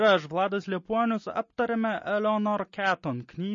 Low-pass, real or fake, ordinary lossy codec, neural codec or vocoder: 7.2 kHz; real; MP3, 32 kbps; none